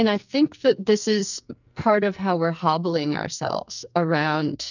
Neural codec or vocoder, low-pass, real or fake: codec, 44.1 kHz, 2.6 kbps, SNAC; 7.2 kHz; fake